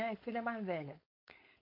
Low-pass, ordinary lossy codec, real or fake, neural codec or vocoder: 5.4 kHz; MP3, 32 kbps; fake; codec, 16 kHz, 4.8 kbps, FACodec